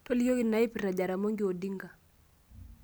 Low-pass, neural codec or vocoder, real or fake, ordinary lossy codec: none; none; real; none